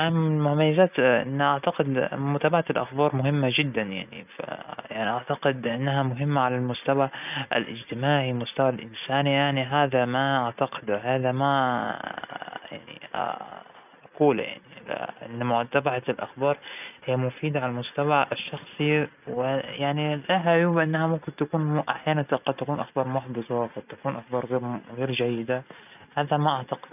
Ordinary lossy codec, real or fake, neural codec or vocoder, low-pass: none; real; none; 3.6 kHz